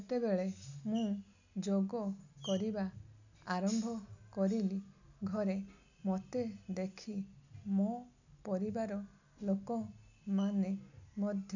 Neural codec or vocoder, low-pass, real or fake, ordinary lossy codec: none; 7.2 kHz; real; none